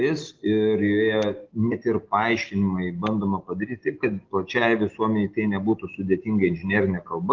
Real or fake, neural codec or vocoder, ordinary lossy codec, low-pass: real; none; Opus, 16 kbps; 7.2 kHz